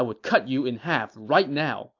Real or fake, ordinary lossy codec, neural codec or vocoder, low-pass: real; AAC, 48 kbps; none; 7.2 kHz